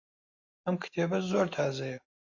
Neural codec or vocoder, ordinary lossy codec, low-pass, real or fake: none; AAC, 48 kbps; 7.2 kHz; real